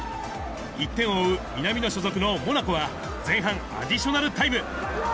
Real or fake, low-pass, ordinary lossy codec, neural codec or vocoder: real; none; none; none